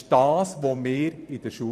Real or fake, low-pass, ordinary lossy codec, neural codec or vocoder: fake; 14.4 kHz; none; vocoder, 44.1 kHz, 128 mel bands every 512 samples, BigVGAN v2